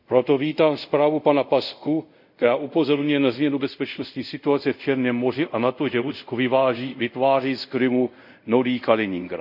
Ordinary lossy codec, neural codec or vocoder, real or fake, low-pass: none; codec, 24 kHz, 0.5 kbps, DualCodec; fake; 5.4 kHz